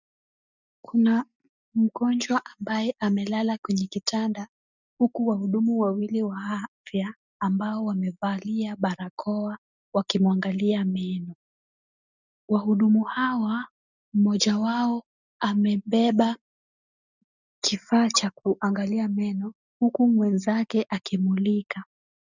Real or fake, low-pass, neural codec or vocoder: real; 7.2 kHz; none